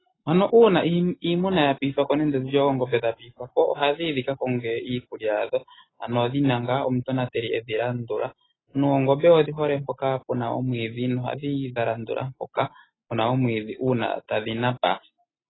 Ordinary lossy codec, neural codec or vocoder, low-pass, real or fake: AAC, 16 kbps; none; 7.2 kHz; real